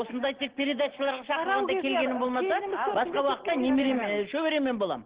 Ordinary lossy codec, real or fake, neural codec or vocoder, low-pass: Opus, 16 kbps; real; none; 3.6 kHz